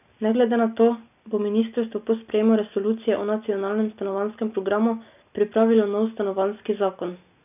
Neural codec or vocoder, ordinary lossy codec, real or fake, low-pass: none; none; real; 3.6 kHz